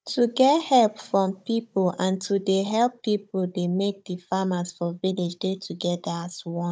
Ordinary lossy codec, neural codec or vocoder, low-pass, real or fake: none; codec, 16 kHz, 16 kbps, FunCodec, trained on Chinese and English, 50 frames a second; none; fake